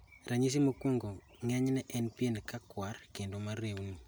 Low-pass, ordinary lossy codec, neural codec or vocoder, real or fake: none; none; none; real